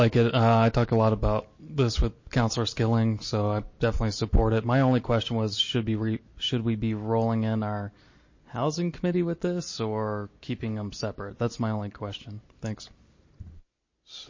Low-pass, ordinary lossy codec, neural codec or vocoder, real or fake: 7.2 kHz; MP3, 32 kbps; none; real